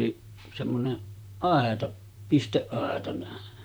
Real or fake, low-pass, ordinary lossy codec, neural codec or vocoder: fake; none; none; vocoder, 44.1 kHz, 128 mel bands, Pupu-Vocoder